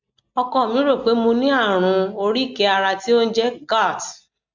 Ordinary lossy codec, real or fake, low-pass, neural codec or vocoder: MP3, 64 kbps; real; 7.2 kHz; none